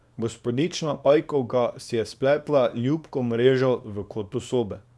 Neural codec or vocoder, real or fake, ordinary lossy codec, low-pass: codec, 24 kHz, 0.9 kbps, WavTokenizer, small release; fake; none; none